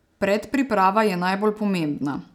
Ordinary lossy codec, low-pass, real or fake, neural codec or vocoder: none; 19.8 kHz; real; none